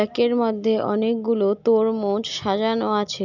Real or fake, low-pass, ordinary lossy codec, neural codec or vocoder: real; 7.2 kHz; none; none